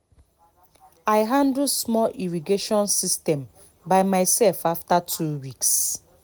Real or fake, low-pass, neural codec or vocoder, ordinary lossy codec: real; none; none; none